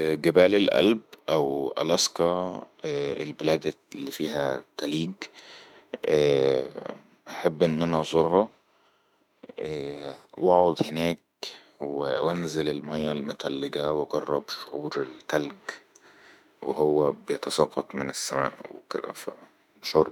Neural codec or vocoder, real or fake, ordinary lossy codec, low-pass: autoencoder, 48 kHz, 32 numbers a frame, DAC-VAE, trained on Japanese speech; fake; none; 19.8 kHz